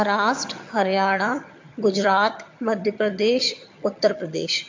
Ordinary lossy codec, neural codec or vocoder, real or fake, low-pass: MP3, 48 kbps; vocoder, 22.05 kHz, 80 mel bands, HiFi-GAN; fake; 7.2 kHz